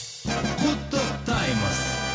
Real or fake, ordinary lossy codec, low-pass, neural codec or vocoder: real; none; none; none